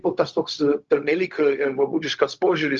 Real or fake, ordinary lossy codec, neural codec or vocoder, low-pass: fake; Opus, 24 kbps; codec, 16 kHz, 0.4 kbps, LongCat-Audio-Codec; 7.2 kHz